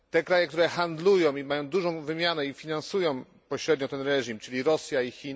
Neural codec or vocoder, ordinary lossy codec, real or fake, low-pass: none; none; real; none